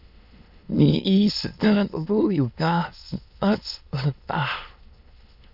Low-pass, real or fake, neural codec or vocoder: 5.4 kHz; fake; autoencoder, 22.05 kHz, a latent of 192 numbers a frame, VITS, trained on many speakers